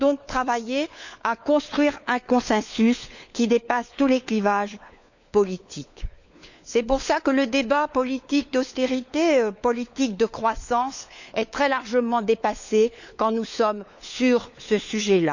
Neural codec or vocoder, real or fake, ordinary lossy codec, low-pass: codec, 16 kHz, 4 kbps, FunCodec, trained on LibriTTS, 50 frames a second; fake; none; 7.2 kHz